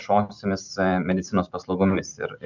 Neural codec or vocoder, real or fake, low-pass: none; real; 7.2 kHz